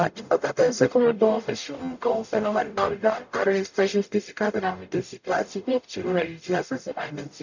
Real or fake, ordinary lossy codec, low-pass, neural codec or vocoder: fake; none; 7.2 kHz; codec, 44.1 kHz, 0.9 kbps, DAC